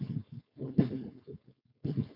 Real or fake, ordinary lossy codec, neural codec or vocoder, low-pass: fake; MP3, 48 kbps; codec, 16 kHz, 4.8 kbps, FACodec; 5.4 kHz